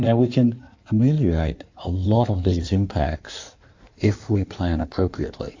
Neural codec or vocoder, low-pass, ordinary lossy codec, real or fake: codec, 16 kHz in and 24 kHz out, 1.1 kbps, FireRedTTS-2 codec; 7.2 kHz; AAC, 48 kbps; fake